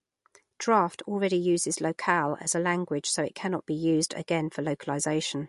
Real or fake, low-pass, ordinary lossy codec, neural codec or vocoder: real; 14.4 kHz; MP3, 48 kbps; none